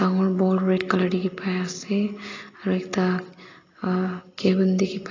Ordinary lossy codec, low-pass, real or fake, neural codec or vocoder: AAC, 32 kbps; 7.2 kHz; real; none